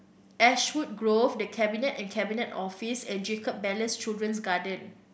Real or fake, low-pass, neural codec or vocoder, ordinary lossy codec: real; none; none; none